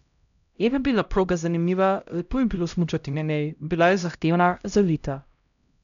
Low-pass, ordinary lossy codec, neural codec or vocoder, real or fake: 7.2 kHz; none; codec, 16 kHz, 0.5 kbps, X-Codec, HuBERT features, trained on LibriSpeech; fake